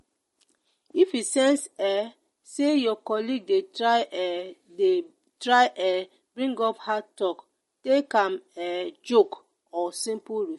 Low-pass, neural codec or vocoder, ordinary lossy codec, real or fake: 19.8 kHz; none; MP3, 48 kbps; real